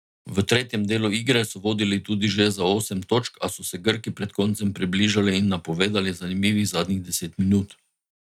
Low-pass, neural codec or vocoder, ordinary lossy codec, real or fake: 19.8 kHz; none; none; real